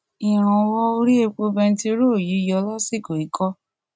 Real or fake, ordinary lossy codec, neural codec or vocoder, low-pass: real; none; none; none